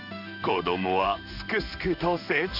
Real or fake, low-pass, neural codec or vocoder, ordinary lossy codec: fake; 5.4 kHz; codec, 16 kHz in and 24 kHz out, 1 kbps, XY-Tokenizer; none